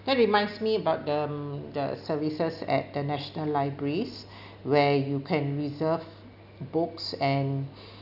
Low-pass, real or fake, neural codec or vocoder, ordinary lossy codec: 5.4 kHz; real; none; none